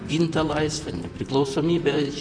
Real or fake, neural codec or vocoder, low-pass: fake; vocoder, 44.1 kHz, 128 mel bands, Pupu-Vocoder; 9.9 kHz